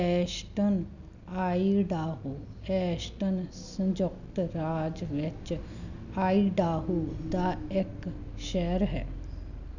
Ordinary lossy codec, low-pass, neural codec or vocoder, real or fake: none; 7.2 kHz; none; real